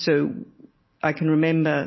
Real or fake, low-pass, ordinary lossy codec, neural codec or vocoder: real; 7.2 kHz; MP3, 24 kbps; none